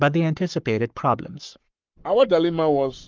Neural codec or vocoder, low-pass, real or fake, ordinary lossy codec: codec, 44.1 kHz, 7.8 kbps, Pupu-Codec; 7.2 kHz; fake; Opus, 24 kbps